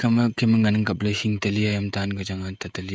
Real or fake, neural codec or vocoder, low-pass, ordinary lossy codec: fake; codec, 16 kHz, 16 kbps, FreqCodec, smaller model; none; none